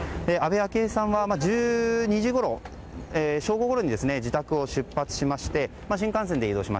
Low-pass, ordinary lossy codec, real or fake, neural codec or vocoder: none; none; real; none